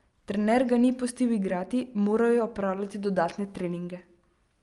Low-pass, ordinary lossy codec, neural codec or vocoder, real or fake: 10.8 kHz; Opus, 32 kbps; none; real